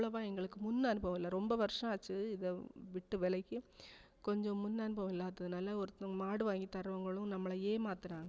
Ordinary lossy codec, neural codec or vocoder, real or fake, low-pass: none; none; real; none